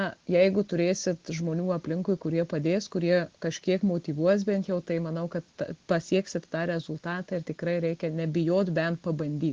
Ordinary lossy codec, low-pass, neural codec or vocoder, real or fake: Opus, 16 kbps; 7.2 kHz; none; real